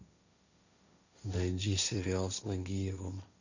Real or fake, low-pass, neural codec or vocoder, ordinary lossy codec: fake; 7.2 kHz; codec, 16 kHz, 1.1 kbps, Voila-Tokenizer; none